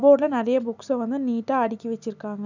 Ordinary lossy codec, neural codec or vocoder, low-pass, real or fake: none; none; 7.2 kHz; real